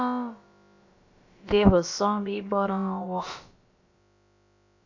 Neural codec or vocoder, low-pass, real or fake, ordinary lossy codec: codec, 16 kHz, about 1 kbps, DyCAST, with the encoder's durations; 7.2 kHz; fake; AAC, 48 kbps